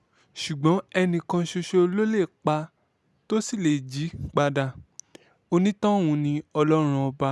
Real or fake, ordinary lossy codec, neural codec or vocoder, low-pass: real; none; none; none